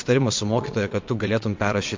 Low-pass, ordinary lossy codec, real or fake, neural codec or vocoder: 7.2 kHz; MP3, 48 kbps; fake; vocoder, 22.05 kHz, 80 mel bands, WaveNeXt